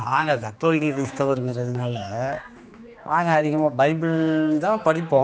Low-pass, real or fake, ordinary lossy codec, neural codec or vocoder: none; fake; none; codec, 16 kHz, 2 kbps, X-Codec, HuBERT features, trained on general audio